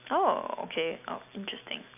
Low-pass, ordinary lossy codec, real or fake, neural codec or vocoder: 3.6 kHz; none; real; none